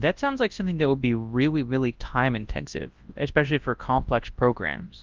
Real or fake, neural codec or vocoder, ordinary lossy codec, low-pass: fake; codec, 24 kHz, 0.9 kbps, WavTokenizer, large speech release; Opus, 32 kbps; 7.2 kHz